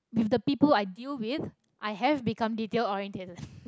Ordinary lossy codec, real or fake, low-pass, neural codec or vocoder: none; real; none; none